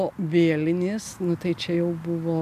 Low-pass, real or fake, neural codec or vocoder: 14.4 kHz; real; none